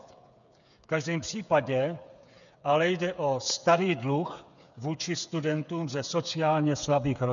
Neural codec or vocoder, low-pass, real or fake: codec, 16 kHz, 8 kbps, FreqCodec, smaller model; 7.2 kHz; fake